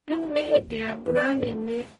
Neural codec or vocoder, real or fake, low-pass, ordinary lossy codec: codec, 44.1 kHz, 0.9 kbps, DAC; fake; 19.8 kHz; MP3, 48 kbps